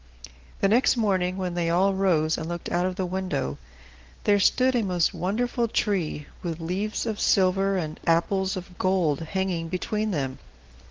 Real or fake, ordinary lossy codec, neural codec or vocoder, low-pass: real; Opus, 16 kbps; none; 7.2 kHz